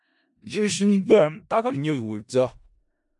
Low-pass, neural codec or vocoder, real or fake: 10.8 kHz; codec, 16 kHz in and 24 kHz out, 0.4 kbps, LongCat-Audio-Codec, four codebook decoder; fake